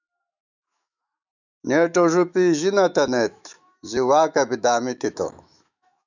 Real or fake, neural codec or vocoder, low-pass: fake; autoencoder, 48 kHz, 128 numbers a frame, DAC-VAE, trained on Japanese speech; 7.2 kHz